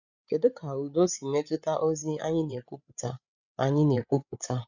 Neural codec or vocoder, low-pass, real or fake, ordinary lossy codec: codec, 16 kHz, 8 kbps, FreqCodec, larger model; 7.2 kHz; fake; none